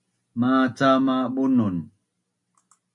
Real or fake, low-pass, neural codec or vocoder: real; 10.8 kHz; none